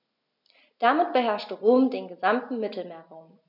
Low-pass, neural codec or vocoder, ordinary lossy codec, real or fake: 5.4 kHz; none; none; real